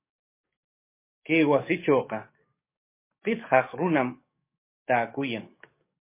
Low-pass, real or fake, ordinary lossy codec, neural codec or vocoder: 3.6 kHz; fake; MP3, 24 kbps; codec, 44.1 kHz, 7.8 kbps, DAC